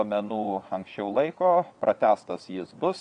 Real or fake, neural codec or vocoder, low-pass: fake; vocoder, 22.05 kHz, 80 mel bands, WaveNeXt; 9.9 kHz